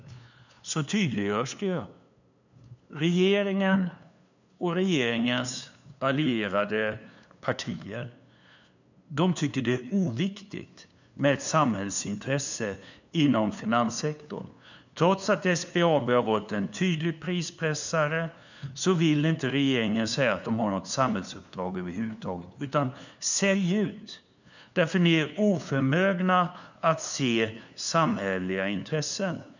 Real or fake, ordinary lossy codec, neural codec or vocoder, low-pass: fake; none; codec, 16 kHz, 2 kbps, FunCodec, trained on LibriTTS, 25 frames a second; 7.2 kHz